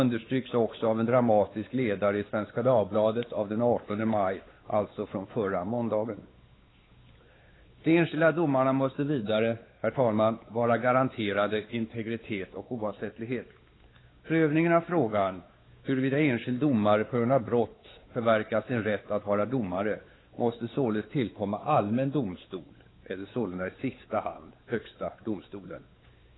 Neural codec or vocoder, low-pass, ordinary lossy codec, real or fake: codec, 16 kHz, 4 kbps, X-Codec, WavLM features, trained on Multilingual LibriSpeech; 7.2 kHz; AAC, 16 kbps; fake